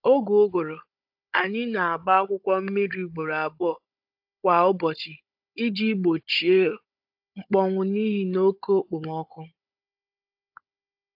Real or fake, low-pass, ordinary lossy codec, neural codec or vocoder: fake; 5.4 kHz; AAC, 48 kbps; codec, 16 kHz, 16 kbps, FunCodec, trained on Chinese and English, 50 frames a second